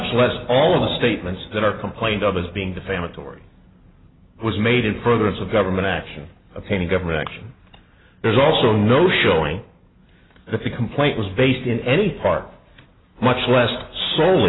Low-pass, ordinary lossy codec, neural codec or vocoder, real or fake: 7.2 kHz; AAC, 16 kbps; none; real